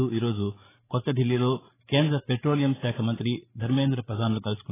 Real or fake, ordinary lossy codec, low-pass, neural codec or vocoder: fake; AAC, 16 kbps; 3.6 kHz; codec, 16 kHz, 8 kbps, FreqCodec, larger model